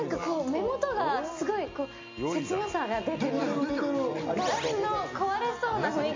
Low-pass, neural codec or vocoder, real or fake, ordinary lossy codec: 7.2 kHz; none; real; MP3, 48 kbps